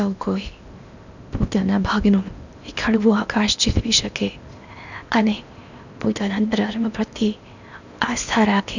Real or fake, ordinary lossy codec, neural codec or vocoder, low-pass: fake; none; codec, 16 kHz in and 24 kHz out, 0.8 kbps, FocalCodec, streaming, 65536 codes; 7.2 kHz